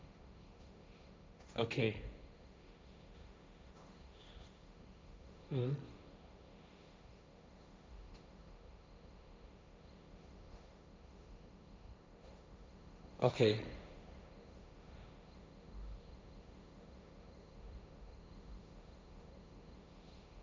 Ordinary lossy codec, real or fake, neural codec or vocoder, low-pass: none; fake; codec, 16 kHz, 1.1 kbps, Voila-Tokenizer; none